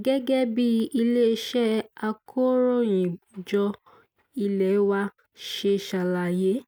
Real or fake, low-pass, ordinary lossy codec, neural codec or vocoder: real; none; none; none